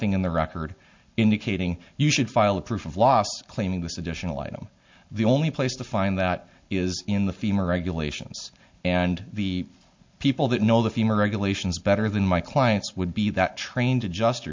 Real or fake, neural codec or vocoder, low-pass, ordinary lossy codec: real; none; 7.2 kHz; MP3, 48 kbps